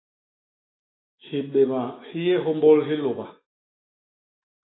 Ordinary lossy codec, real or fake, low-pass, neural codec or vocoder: AAC, 16 kbps; fake; 7.2 kHz; autoencoder, 48 kHz, 128 numbers a frame, DAC-VAE, trained on Japanese speech